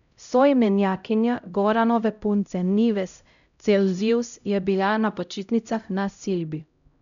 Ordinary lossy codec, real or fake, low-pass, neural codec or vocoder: none; fake; 7.2 kHz; codec, 16 kHz, 0.5 kbps, X-Codec, HuBERT features, trained on LibriSpeech